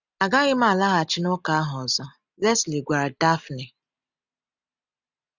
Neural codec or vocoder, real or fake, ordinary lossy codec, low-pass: none; real; none; 7.2 kHz